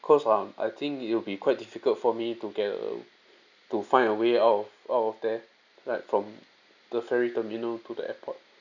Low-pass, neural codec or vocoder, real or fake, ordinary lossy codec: 7.2 kHz; none; real; none